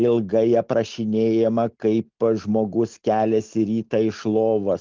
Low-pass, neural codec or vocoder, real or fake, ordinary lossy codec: 7.2 kHz; none; real; Opus, 24 kbps